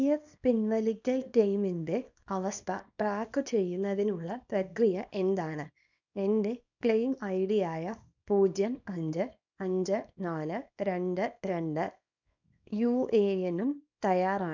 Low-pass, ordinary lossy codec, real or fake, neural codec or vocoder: 7.2 kHz; none; fake; codec, 24 kHz, 0.9 kbps, WavTokenizer, small release